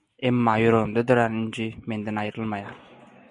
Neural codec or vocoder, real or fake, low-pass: none; real; 10.8 kHz